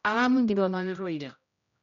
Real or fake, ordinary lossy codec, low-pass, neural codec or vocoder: fake; none; 7.2 kHz; codec, 16 kHz, 0.5 kbps, X-Codec, HuBERT features, trained on general audio